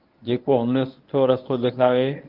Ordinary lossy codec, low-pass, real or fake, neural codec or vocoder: Opus, 32 kbps; 5.4 kHz; fake; codec, 24 kHz, 0.9 kbps, WavTokenizer, medium speech release version 1